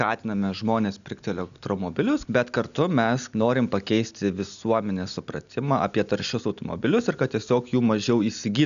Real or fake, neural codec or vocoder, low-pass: real; none; 7.2 kHz